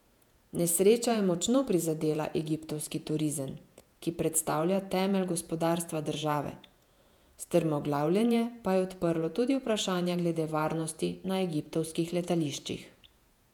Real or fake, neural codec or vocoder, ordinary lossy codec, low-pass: fake; vocoder, 48 kHz, 128 mel bands, Vocos; none; 19.8 kHz